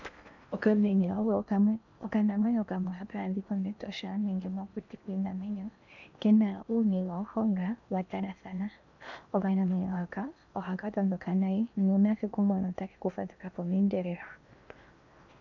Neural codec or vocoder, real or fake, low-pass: codec, 16 kHz in and 24 kHz out, 0.8 kbps, FocalCodec, streaming, 65536 codes; fake; 7.2 kHz